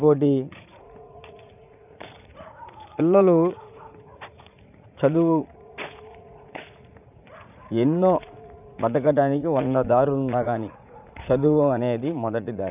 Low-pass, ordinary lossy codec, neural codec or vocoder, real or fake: 3.6 kHz; none; vocoder, 44.1 kHz, 80 mel bands, Vocos; fake